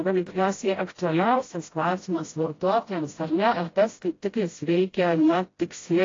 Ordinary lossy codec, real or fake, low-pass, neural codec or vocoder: AAC, 32 kbps; fake; 7.2 kHz; codec, 16 kHz, 0.5 kbps, FreqCodec, smaller model